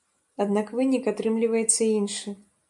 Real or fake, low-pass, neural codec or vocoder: real; 10.8 kHz; none